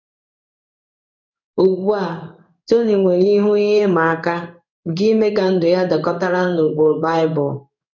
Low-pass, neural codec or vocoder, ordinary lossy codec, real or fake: 7.2 kHz; codec, 16 kHz in and 24 kHz out, 1 kbps, XY-Tokenizer; none; fake